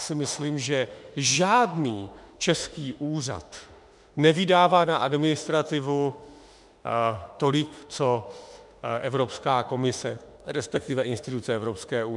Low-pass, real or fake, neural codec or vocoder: 10.8 kHz; fake; autoencoder, 48 kHz, 32 numbers a frame, DAC-VAE, trained on Japanese speech